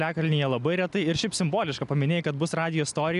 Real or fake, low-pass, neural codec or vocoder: real; 10.8 kHz; none